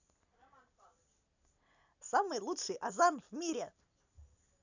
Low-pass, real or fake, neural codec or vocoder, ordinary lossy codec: 7.2 kHz; real; none; none